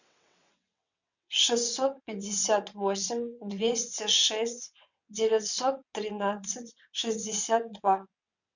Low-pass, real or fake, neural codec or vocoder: 7.2 kHz; fake; vocoder, 22.05 kHz, 80 mel bands, WaveNeXt